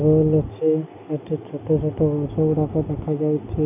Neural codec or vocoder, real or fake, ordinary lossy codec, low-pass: none; real; none; 3.6 kHz